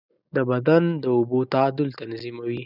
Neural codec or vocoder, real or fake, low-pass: none; real; 5.4 kHz